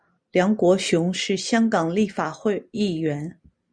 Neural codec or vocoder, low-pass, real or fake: none; 9.9 kHz; real